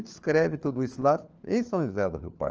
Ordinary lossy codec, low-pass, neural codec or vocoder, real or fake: Opus, 24 kbps; 7.2 kHz; codec, 16 kHz, 4 kbps, FunCodec, trained on LibriTTS, 50 frames a second; fake